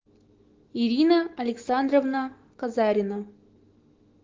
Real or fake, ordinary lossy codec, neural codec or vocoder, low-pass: fake; Opus, 16 kbps; autoencoder, 48 kHz, 128 numbers a frame, DAC-VAE, trained on Japanese speech; 7.2 kHz